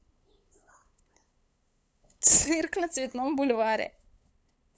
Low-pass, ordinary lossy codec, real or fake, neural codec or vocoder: none; none; fake; codec, 16 kHz, 8 kbps, FunCodec, trained on LibriTTS, 25 frames a second